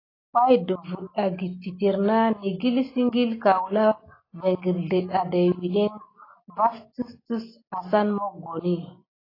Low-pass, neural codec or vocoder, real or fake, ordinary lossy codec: 5.4 kHz; none; real; AAC, 24 kbps